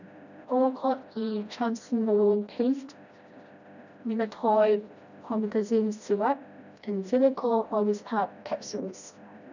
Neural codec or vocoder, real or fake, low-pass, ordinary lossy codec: codec, 16 kHz, 1 kbps, FreqCodec, smaller model; fake; 7.2 kHz; none